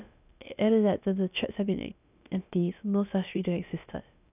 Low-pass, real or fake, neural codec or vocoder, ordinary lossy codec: 3.6 kHz; fake; codec, 16 kHz, about 1 kbps, DyCAST, with the encoder's durations; none